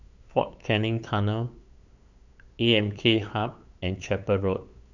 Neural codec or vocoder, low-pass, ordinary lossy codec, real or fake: codec, 16 kHz, 8 kbps, FunCodec, trained on LibriTTS, 25 frames a second; 7.2 kHz; none; fake